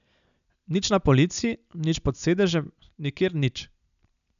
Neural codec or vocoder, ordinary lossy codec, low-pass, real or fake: none; none; 7.2 kHz; real